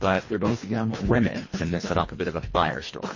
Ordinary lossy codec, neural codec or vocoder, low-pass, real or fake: MP3, 32 kbps; codec, 24 kHz, 1.5 kbps, HILCodec; 7.2 kHz; fake